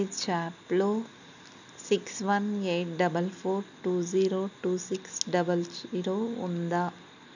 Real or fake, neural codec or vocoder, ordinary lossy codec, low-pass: real; none; none; 7.2 kHz